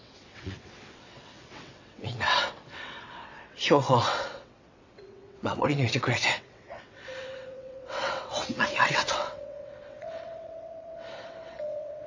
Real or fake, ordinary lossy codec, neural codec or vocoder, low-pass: fake; AAC, 48 kbps; vocoder, 22.05 kHz, 80 mel bands, WaveNeXt; 7.2 kHz